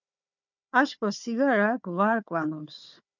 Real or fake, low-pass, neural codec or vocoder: fake; 7.2 kHz; codec, 16 kHz, 4 kbps, FunCodec, trained on Chinese and English, 50 frames a second